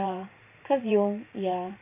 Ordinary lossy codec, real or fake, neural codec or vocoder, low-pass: none; fake; vocoder, 44.1 kHz, 128 mel bands every 512 samples, BigVGAN v2; 3.6 kHz